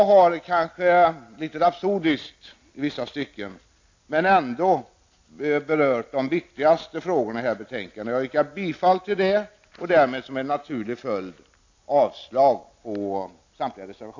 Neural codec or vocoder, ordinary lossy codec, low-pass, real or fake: none; AAC, 48 kbps; 7.2 kHz; real